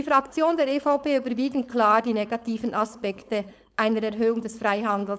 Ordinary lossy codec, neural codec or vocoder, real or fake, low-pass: none; codec, 16 kHz, 4.8 kbps, FACodec; fake; none